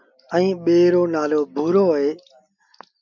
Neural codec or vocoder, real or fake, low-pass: none; real; 7.2 kHz